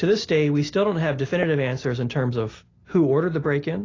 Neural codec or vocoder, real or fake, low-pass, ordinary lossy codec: none; real; 7.2 kHz; AAC, 32 kbps